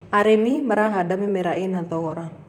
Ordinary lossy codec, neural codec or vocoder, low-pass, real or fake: none; vocoder, 44.1 kHz, 128 mel bands, Pupu-Vocoder; 19.8 kHz; fake